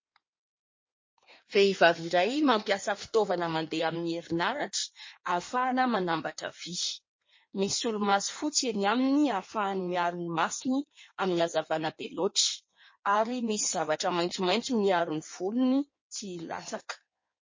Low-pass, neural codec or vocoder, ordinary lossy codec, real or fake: 7.2 kHz; codec, 16 kHz in and 24 kHz out, 1.1 kbps, FireRedTTS-2 codec; MP3, 32 kbps; fake